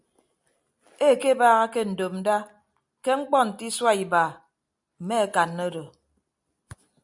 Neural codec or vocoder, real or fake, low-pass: none; real; 10.8 kHz